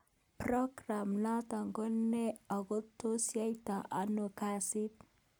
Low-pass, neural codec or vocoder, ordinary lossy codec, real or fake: none; none; none; real